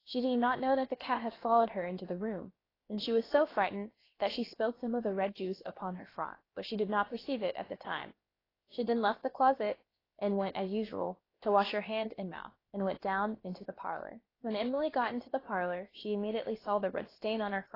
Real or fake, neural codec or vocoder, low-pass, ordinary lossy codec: fake; codec, 16 kHz, about 1 kbps, DyCAST, with the encoder's durations; 5.4 kHz; AAC, 24 kbps